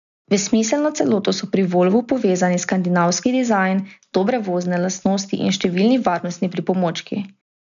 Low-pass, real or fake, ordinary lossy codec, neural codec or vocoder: 7.2 kHz; real; none; none